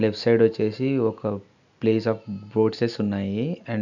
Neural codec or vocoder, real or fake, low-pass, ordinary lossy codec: none; real; 7.2 kHz; none